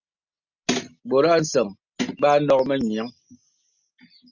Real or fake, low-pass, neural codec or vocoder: real; 7.2 kHz; none